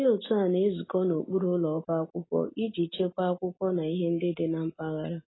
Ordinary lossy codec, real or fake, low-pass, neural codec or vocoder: AAC, 16 kbps; real; 7.2 kHz; none